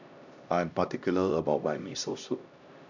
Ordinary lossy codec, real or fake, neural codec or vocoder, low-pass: none; fake; codec, 16 kHz, 1 kbps, X-Codec, HuBERT features, trained on LibriSpeech; 7.2 kHz